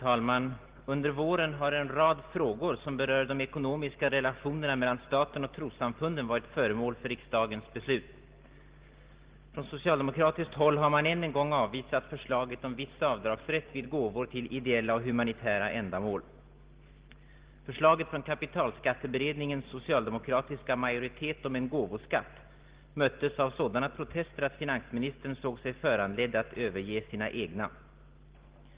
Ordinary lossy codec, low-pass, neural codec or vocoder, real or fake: Opus, 16 kbps; 3.6 kHz; none; real